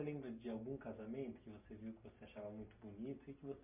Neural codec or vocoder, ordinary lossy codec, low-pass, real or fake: none; MP3, 32 kbps; 3.6 kHz; real